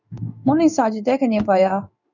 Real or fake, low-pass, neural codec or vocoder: fake; 7.2 kHz; codec, 16 kHz in and 24 kHz out, 1 kbps, XY-Tokenizer